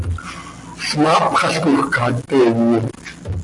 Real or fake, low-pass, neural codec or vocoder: real; 10.8 kHz; none